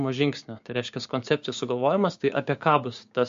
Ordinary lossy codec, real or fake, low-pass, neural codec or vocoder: MP3, 48 kbps; fake; 7.2 kHz; codec, 16 kHz, 6 kbps, DAC